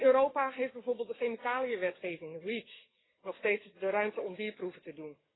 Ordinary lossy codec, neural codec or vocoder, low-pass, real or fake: AAC, 16 kbps; codec, 44.1 kHz, 7.8 kbps, DAC; 7.2 kHz; fake